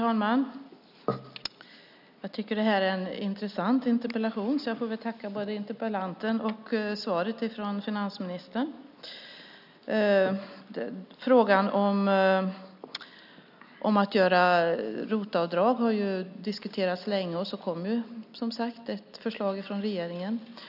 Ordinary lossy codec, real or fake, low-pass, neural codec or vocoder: none; real; 5.4 kHz; none